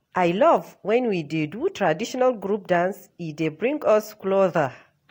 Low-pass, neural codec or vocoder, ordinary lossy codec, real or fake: 19.8 kHz; none; AAC, 48 kbps; real